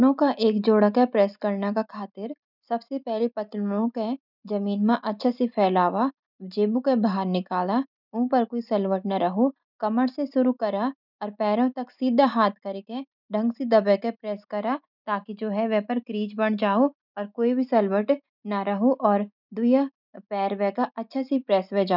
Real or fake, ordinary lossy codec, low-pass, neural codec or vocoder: real; none; 5.4 kHz; none